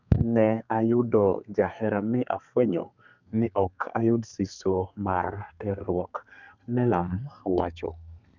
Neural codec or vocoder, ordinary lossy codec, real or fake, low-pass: codec, 32 kHz, 1.9 kbps, SNAC; none; fake; 7.2 kHz